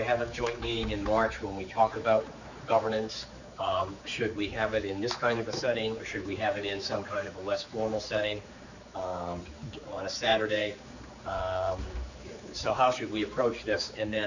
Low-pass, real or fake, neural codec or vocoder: 7.2 kHz; fake; codec, 16 kHz, 4 kbps, X-Codec, HuBERT features, trained on general audio